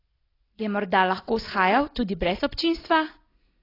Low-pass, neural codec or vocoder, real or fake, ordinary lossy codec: 5.4 kHz; none; real; AAC, 24 kbps